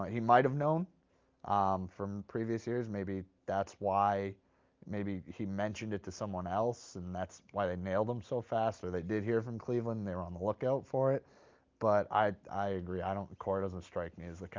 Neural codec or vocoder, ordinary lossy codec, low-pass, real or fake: none; Opus, 32 kbps; 7.2 kHz; real